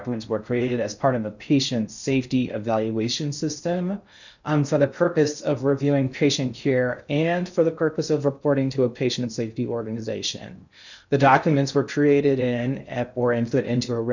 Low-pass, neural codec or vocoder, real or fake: 7.2 kHz; codec, 16 kHz in and 24 kHz out, 0.6 kbps, FocalCodec, streaming, 4096 codes; fake